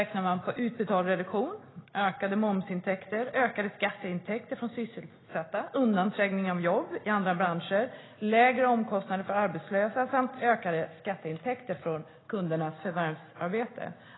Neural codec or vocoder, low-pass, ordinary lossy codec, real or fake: none; 7.2 kHz; AAC, 16 kbps; real